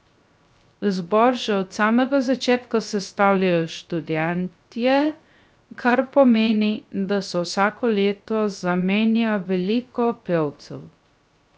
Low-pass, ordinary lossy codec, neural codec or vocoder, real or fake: none; none; codec, 16 kHz, 0.3 kbps, FocalCodec; fake